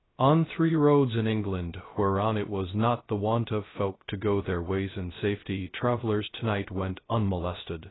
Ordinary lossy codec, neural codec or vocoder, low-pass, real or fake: AAC, 16 kbps; codec, 16 kHz, 0.2 kbps, FocalCodec; 7.2 kHz; fake